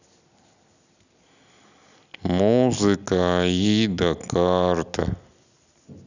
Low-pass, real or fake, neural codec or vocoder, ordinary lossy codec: 7.2 kHz; real; none; none